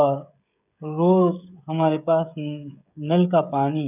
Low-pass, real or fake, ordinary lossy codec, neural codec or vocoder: 3.6 kHz; fake; none; codec, 16 kHz, 16 kbps, FreqCodec, smaller model